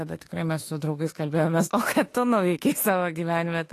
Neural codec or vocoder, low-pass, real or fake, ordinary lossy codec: autoencoder, 48 kHz, 32 numbers a frame, DAC-VAE, trained on Japanese speech; 14.4 kHz; fake; AAC, 48 kbps